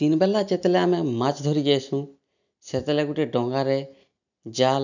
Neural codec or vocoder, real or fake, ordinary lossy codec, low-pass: none; real; none; 7.2 kHz